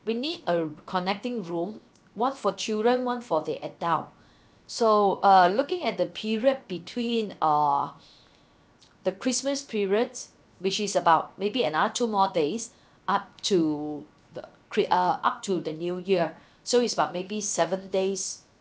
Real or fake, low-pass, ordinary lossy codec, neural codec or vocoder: fake; none; none; codec, 16 kHz, 0.7 kbps, FocalCodec